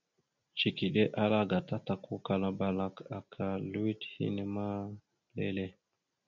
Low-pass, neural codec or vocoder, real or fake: 7.2 kHz; none; real